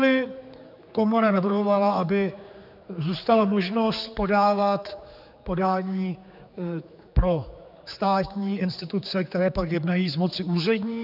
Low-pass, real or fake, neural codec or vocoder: 5.4 kHz; fake; codec, 16 kHz, 4 kbps, X-Codec, HuBERT features, trained on general audio